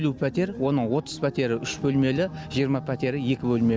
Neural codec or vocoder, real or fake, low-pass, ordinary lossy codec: none; real; none; none